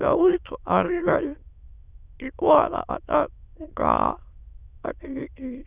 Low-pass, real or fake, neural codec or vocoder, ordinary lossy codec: 3.6 kHz; fake; autoencoder, 22.05 kHz, a latent of 192 numbers a frame, VITS, trained on many speakers; Opus, 64 kbps